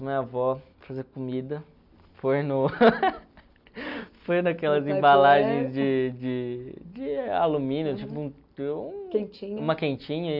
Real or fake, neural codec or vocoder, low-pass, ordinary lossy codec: real; none; 5.4 kHz; none